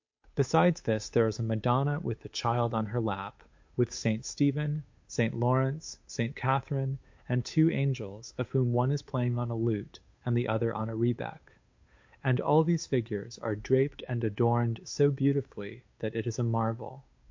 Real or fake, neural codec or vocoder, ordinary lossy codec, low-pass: fake; codec, 16 kHz, 8 kbps, FunCodec, trained on Chinese and English, 25 frames a second; MP3, 48 kbps; 7.2 kHz